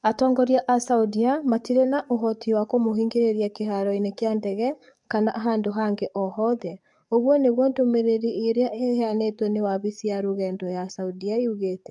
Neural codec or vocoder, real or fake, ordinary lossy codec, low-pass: codec, 44.1 kHz, 7.8 kbps, DAC; fake; MP3, 64 kbps; 10.8 kHz